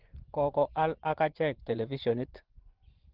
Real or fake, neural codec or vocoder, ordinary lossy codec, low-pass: fake; vocoder, 24 kHz, 100 mel bands, Vocos; Opus, 16 kbps; 5.4 kHz